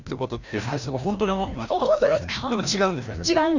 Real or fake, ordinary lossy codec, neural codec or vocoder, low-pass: fake; none; codec, 16 kHz, 1 kbps, FreqCodec, larger model; 7.2 kHz